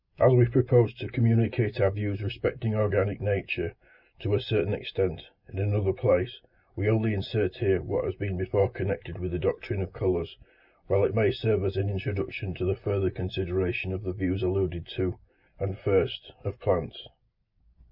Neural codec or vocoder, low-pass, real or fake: none; 5.4 kHz; real